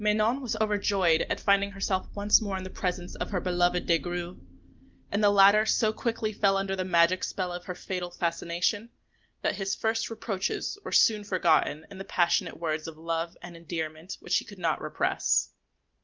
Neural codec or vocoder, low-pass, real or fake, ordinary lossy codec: none; 7.2 kHz; real; Opus, 32 kbps